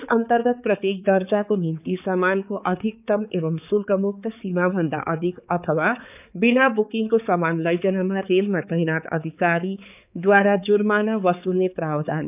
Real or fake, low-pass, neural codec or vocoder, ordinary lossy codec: fake; 3.6 kHz; codec, 16 kHz, 4 kbps, X-Codec, HuBERT features, trained on balanced general audio; none